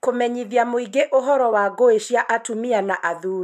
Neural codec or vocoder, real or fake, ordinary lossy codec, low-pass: none; real; MP3, 96 kbps; 14.4 kHz